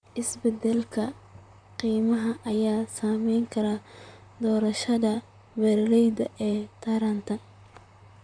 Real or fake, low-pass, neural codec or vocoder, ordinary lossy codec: fake; 9.9 kHz; vocoder, 44.1 kHz, 128 mel bands, Pupu-Vocoder; none